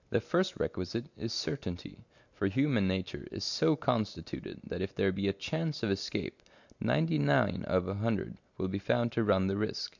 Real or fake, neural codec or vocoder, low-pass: real; none; 7.2 kHz